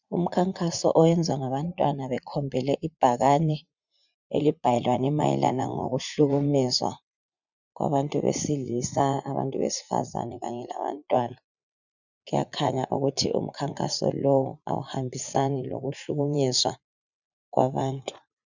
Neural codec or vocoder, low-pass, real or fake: vocoder, 44.1 kHz, 80 mel bands, Vocos; 7.2 kHz; fake